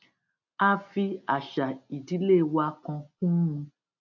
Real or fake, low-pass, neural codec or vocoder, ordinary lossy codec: real; 7.2 kHz; none; none